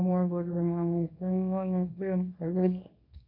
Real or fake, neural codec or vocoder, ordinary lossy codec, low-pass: fake; codec, 24 kHz, 0.9 kbps, WavTokenizer, small release; none; 5.4 kHz